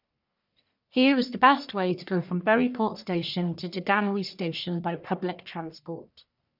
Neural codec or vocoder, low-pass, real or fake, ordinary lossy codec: codec, 44.1 kHz, 1.7 kbps, Pupu-Codec; 5.4 kHz; fake; none